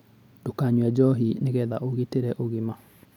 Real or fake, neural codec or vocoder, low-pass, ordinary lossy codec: real; none; 19.8 kHz; none